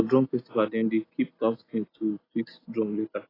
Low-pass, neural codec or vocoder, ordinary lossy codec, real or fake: 5.4 kHz; none; AAC, 24 kbps; real